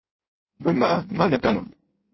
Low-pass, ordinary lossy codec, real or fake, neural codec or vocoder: 7.2 kHz; MP3, 24 kbps; fake; codec, 16 kHz in and 24 kHz out, 1.1 kbps, FireRedTTS-2 codec